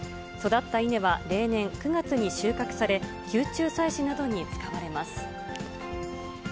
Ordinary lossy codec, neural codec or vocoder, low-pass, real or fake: none; none; none; real